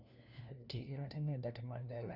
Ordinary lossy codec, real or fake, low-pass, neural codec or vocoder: none; fake; 5.4 kHz; codec, 16 kHz, 1 kbps, FunCodec, trained on LibriTTS, 50 frames a second